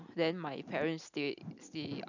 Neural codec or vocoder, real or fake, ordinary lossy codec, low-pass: none; real; none; 7.2 kHz